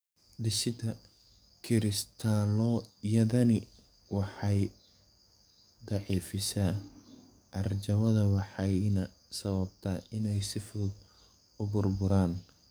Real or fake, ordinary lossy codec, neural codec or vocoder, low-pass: fake; none; codec, 44.1 kHz, 7.8 kbps, DAC; none